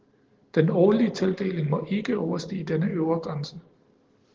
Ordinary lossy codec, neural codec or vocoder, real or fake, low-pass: Opus, 16 kbps; none; real; 7.2 kHz